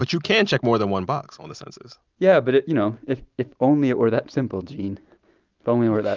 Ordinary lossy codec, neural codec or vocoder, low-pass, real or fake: Opus, 24 kbps; none; 7.2 kHz; real